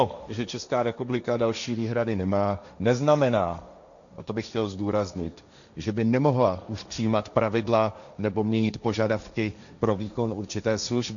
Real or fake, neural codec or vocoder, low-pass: fake; codec, 16 kHz, 1.1 kbps, Voila-Tokenizer; 7.2 kHz